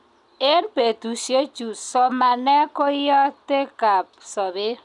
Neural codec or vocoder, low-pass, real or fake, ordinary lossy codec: none; 10.8 kHz; real; none